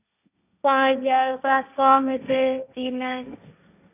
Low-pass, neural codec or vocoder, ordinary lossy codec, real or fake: 3.6 kHz; codec, 16 kHz, 1.1 kbps, Voila-Tokenizer; none; fake